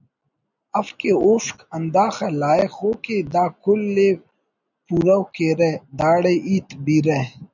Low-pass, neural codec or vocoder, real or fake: 7.2 kHz; none; real